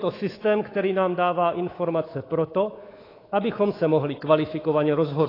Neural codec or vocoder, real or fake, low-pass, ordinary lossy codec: codec, 24 kHz, 3.1 kbps, DualCodec; fake; 5.4 kHz; AAC, 24 kbps